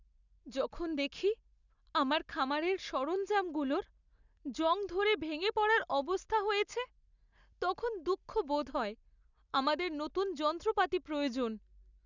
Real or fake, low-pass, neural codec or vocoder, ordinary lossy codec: real; 7.2 kHz; none; none